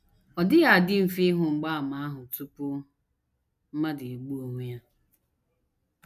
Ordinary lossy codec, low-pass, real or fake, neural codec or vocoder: none; 14.4 kHz; real; none